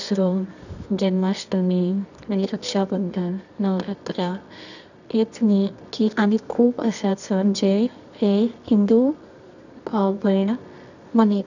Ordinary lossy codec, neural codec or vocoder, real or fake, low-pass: none; codec, 24 kHz, 0.9 kbps, WavTokenizer, medium music audio release; fake; 7.2 kHz